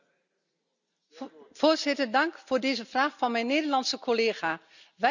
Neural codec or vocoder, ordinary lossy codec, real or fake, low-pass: none; none; real; 7.2 kHz